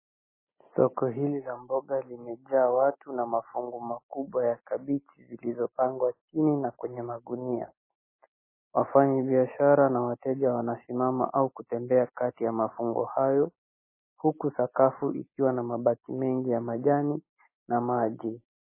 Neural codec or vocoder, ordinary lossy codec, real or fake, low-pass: none; MP3, 16 kbps; real; 3.6 kHz